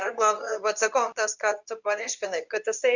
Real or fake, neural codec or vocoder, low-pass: fake; codec, 24 kHz, 0.9 kbps, WavTokenizer, medium speech release version 2; 7.2 kHz